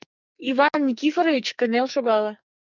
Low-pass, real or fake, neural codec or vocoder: 7.2 kHz; fake; codec, 44.1 kHz, 2.6 kbps, SNAC